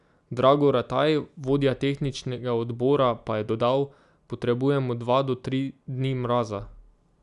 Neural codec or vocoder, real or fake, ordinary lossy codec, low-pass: none; real; none; 10.8 kHz